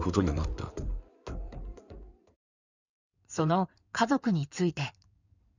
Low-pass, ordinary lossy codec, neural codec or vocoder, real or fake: 7.2 kHz; none; codec, 16 kHz in and 24 kHz out, 2.2 kbps, FireRedTTS-2 codec; fake